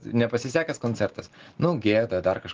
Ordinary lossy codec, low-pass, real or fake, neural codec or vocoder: Opus, 16 kbps; 7.2 kHz; real; none